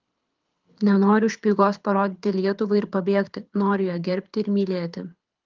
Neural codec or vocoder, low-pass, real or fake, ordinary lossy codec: codec, 24 kHz, 6 kbps, HILCodec; 7.2 kHz; fake; Opus, 24 kbps